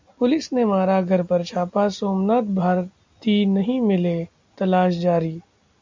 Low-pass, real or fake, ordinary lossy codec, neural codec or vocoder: 7.2 kHz; real; MP3, 64 kbps; none